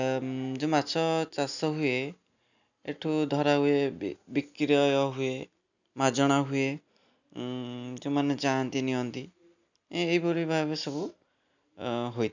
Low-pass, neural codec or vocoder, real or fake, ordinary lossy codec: 7.2 kHz; none; real; none